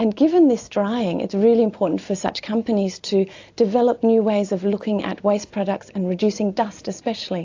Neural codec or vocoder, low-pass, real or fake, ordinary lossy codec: none; 7.2 kHz; real; AAC, 48 kbps